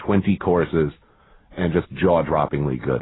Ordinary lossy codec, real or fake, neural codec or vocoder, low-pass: AAC, 16 kbps; real; none; 7.2 kHz